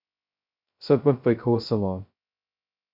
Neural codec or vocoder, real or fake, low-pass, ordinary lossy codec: codec, 16 kHz, 0.2 kbps, FocalCodec; fake; 5.4 kHz; MP3, 48 kbps